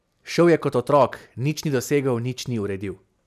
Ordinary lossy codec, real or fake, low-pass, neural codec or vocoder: none; real; 14.4 kHz; none